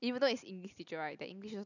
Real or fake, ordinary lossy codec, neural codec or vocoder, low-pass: real; none; none; 7.2 kHz